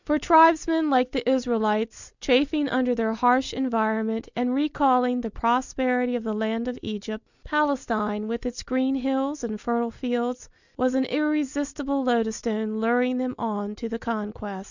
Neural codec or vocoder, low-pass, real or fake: none; 7.2 kHz; real